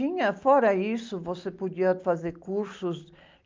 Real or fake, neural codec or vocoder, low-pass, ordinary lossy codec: real; none; 7.2 kHz; Opus, 32 kbps